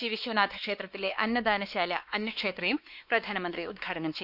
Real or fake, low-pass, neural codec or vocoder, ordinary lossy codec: fake; 5.4 kHz; codec, 16 kHz, 2 kbps, X-Codec, WavLM features, trained on Multilingual LibriSpeech; none